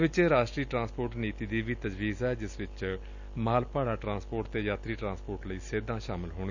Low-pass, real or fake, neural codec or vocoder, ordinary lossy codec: 7.2 kHz; real; none; none